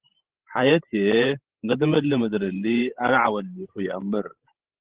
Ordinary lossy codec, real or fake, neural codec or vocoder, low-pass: Opus, 16 kbps; fake; codec, 16 kHz, 16 kbps, FreqCodec, larger model; 3.6 kHz